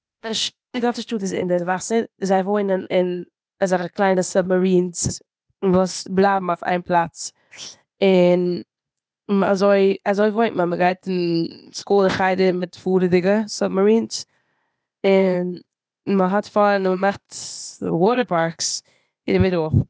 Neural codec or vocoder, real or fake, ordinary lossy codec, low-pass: codec, 16 kHz, 0.8 kbps, ZipCodec; fake; none; none